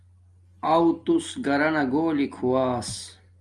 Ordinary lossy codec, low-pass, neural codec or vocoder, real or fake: Opus, 32 kbps; 10.8 kHz; none; real